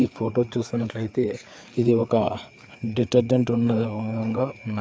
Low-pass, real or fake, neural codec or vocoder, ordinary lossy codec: none; fake; codec, 16 kHz, 4 kbps, FreqCodec, larger model; none